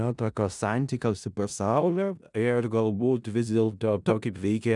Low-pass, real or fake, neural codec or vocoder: 10.8 kHz; fake; codec, 16 kHz in and 24 kHz out, 0.4 kbps, LongCat-Audio-Codec, four codebook decoder